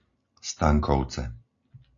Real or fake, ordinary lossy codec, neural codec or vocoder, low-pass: real; AAC, 48 kbps; none; 7.2 kHz